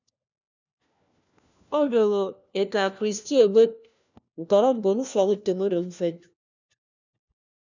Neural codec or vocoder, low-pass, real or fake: codec, 16 kHz, 1 kbps, FunCodec, trained on LibriTTS, 50 frames a second; 7.2 kHz; fake